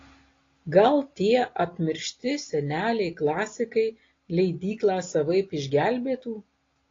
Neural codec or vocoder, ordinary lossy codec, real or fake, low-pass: none; AAC, 32 kbps; real; 7.2 kHz